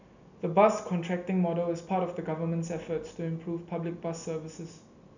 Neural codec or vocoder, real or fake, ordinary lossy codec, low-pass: none; real; none; 7.2 kHz